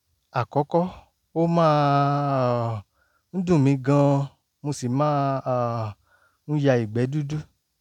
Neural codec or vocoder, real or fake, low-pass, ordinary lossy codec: none; real; 19.8 kHz; none